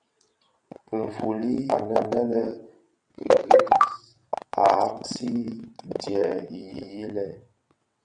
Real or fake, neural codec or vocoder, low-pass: fake; vocoder, 22.05 kHz, 80 mel bands, WaveNeXt; 9.9 kHz